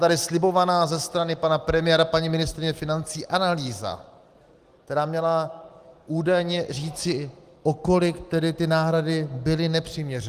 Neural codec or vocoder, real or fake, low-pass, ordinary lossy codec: none; real; 14.4 kHz; Opus, 24 kbps